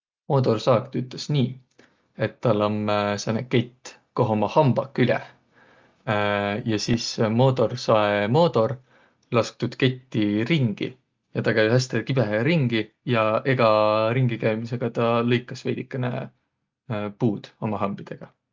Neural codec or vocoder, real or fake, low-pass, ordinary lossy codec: none; real; 7.2 kHz; Opus, 24 kbps